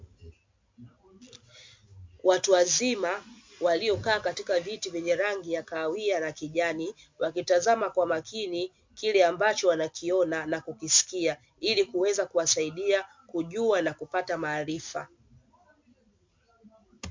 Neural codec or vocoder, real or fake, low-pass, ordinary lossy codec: none; real; 7.2 kHz; MP3, 48 kbps